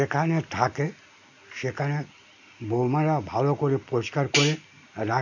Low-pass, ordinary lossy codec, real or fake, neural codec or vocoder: 7.2 kHz; none; real; none